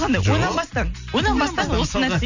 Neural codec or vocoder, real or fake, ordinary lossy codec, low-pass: none; real; none; 7.2 kHz